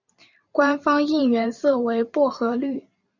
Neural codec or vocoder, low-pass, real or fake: vocoder, 44.1 kHz, 128 mel bands every 512 samples, BigVGAN v2; 7.2 kHz; fake